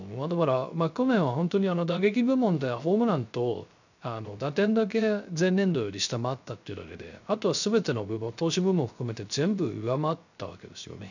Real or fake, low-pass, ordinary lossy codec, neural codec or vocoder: fake; 7.2 kHz; none; codec, 16 kHz, 0.3 kbps, FocalCodec